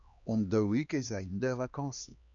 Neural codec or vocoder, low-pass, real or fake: codec, 16 kHz, 2 kbps, X-Codec, HuBERT features, trained on LibriSpeech; 7.2 kHz; fake